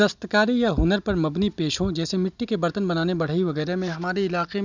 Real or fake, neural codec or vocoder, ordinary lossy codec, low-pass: real; none; none; 7.2 kHz